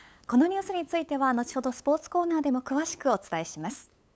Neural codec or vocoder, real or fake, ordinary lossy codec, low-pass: codec, 16 kHz, 8 kbps, FunCodec, trained on LibriTTS, 25 frames a second; fake; none; none